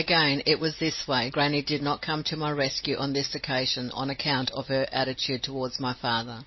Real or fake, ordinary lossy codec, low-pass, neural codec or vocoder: real; MP3, 24 kbps; 7.2 kHz; none